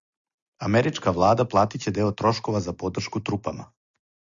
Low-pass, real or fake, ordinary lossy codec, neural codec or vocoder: 7.2 kHz; real; Opus, 64 kbps; none